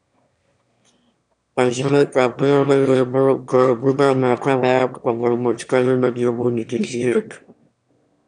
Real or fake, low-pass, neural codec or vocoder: fake; 9.9 kHz; autoencoder, 22.05 kHz, a latent of 192 numbers a frame, VITS, trained on one speaker